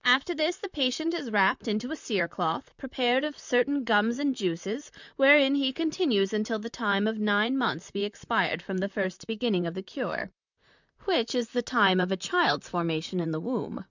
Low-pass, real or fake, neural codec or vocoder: 7.2 kHz; fake; vocoder, 44.1 kHz, 128 mel bands, Pupu-Vocoder